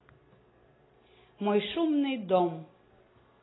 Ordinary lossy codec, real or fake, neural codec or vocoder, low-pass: AAC, 16 kbps; real; none; 7.2 kHz